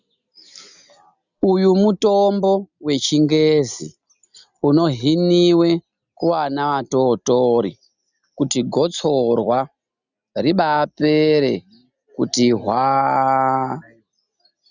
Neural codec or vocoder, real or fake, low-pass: none; real; 7.2 kHz